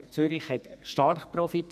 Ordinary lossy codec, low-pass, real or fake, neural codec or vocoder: none; 14.4 kHz; fake; codec, 44.1 kHz, 2.6 kbps, SNAC